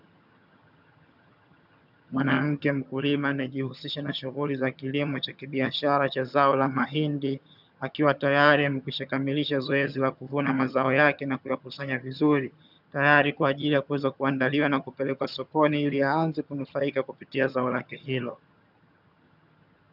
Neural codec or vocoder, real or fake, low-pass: vocoder, 22.05 kHz, 80 mel bands, HiFi-GAN; fake; 5.4 kHz